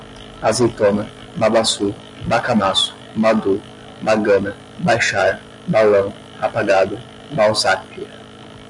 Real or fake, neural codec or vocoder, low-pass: real; none; 10.8 kHz